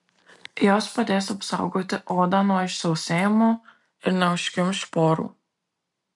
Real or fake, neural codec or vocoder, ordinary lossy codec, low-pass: real; none; MP3, 64 kbps; 10.8 kHz